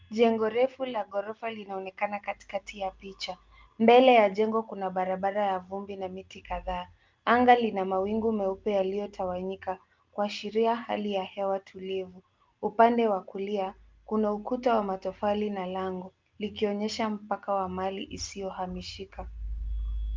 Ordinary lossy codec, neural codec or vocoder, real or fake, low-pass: Opus, 32 kbps; none; real; 7.2 kHz